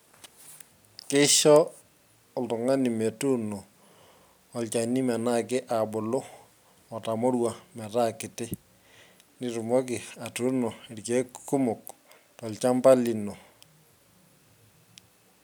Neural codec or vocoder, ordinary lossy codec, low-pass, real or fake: none; none; none; real